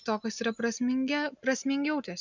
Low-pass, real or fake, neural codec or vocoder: 7.2 kHz; real; none